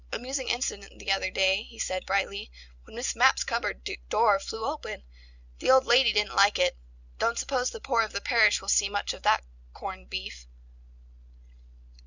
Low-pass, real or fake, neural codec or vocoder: 7.2 kHz; real; none